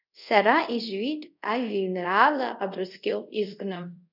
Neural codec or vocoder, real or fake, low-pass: codec, 24 kHz, 0.5 kbps, DualCodec; fake; 5.4 kHz